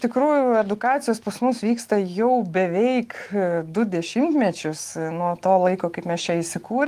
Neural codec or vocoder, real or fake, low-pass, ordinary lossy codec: autoencoder, 48 kHz, 128 numbers a frame, DAC-VAE, trained on Japanese speech; fake; 14.4 kHz; Opus, 24 kbps